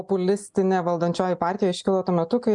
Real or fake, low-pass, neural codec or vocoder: real; 10.8 kHz; none